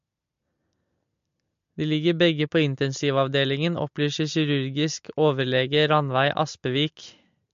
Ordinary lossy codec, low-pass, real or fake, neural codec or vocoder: MP3, 48 kbps; 7.2 kHz; real; none